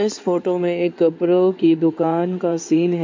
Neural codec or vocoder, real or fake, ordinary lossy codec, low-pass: codec, 16 kHz in and 24 kHz out, 2.2 kbps, FireRedTTS-2 codec; fake; MP3, 48 kbps; 7.2 kHz